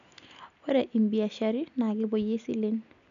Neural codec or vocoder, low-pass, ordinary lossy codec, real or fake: none; 7.2 kHz; none; real